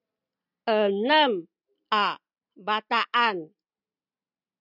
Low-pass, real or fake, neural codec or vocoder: 5.4 kHz; real; none